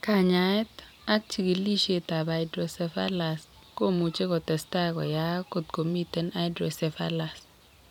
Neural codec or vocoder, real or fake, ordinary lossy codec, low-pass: vocoder, 44.1 kHz, 128 mel bands every 256 samples, BigVGAN v2; fake; none; 19.8 kHz